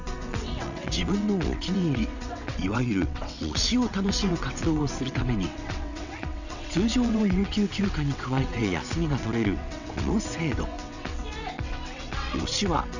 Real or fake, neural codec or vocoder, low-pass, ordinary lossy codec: fake; vocoder, 22.05 kHz, 80 mel bands, WaveNeXt; 7.2 kHz; none